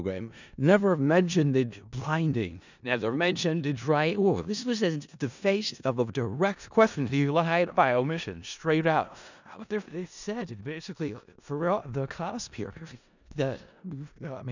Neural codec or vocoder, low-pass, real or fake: codec, 16 kHz in and 24 kHz out, 0.4 kbps, LongCat-Audio-Codec, four codebook decoder; 7.2 kHz; fake